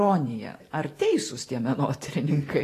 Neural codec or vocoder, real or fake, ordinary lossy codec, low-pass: vocoder, 44.1 kHz, 128 mel bands every 512 samples, BigVGAN v2; fake; AAC, 48 kbps; 14.4 kHz